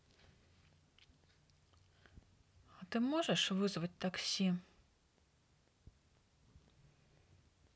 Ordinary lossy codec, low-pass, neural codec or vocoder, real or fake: none; none; none; real